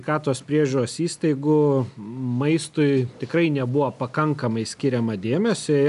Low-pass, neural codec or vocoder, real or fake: 10.8 kHz; none; real